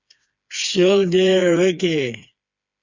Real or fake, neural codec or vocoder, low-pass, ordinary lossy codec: fake; codec, 16 kHz, 4 kbps, FreqCodec, smaller model; 7.2 kHz; Opus, 64 kbps